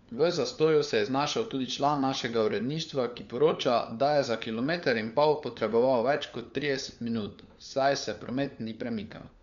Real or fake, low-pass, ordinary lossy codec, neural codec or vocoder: fake; 7.2 kHz; none; codec, 16 kHz, 4 kbps, FunCodec, trained on LibriTTS, 50 frames a second